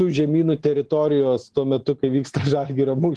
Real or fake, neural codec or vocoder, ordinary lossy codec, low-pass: real; none; Opus, 16 kbps; 10.8 kHz